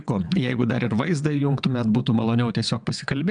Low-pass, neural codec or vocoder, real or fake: 9.9 kHz; vocoder, 22.05 kHz, 80 mel bands, WaveNeXt; fake